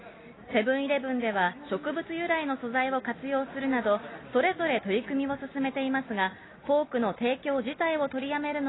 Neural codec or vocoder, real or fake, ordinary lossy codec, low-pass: none; real; AAC, 16 kbps; 7.2 kHz